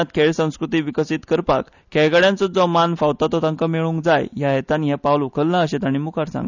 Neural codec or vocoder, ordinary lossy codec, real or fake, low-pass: none; none; real; 7.2 kHz